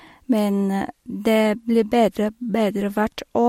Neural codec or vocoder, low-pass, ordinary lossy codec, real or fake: none; 19.8 kHz; MP3, 64 kbps; real